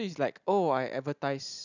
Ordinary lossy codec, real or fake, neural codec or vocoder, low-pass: none; real; none; 7.2 kHz